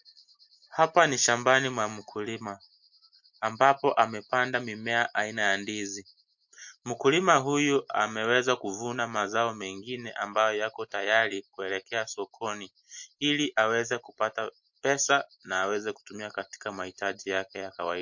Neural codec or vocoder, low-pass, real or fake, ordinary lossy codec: none; 7.2 kHz; real; MP3, 48 kbps